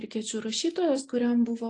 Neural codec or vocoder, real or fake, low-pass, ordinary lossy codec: none; real; 9.9 kHz; AAC, 48 kbps